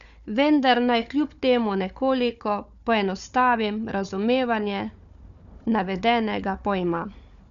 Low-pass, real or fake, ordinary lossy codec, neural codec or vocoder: 7.2 kHz; fake; none; codec, 16 kHz, 4 kbps, FunCodec, trained on Chinese and English, 50 frames a second